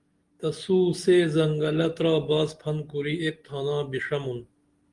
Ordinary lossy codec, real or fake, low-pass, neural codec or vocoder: Opus, 24 kbps; real; 10.8 kHz; none